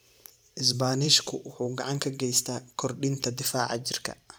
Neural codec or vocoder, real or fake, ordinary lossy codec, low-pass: vocoder, 44.1 kHz, 128 mel bands every 512 samples, BigVGAN v2; fake; none; none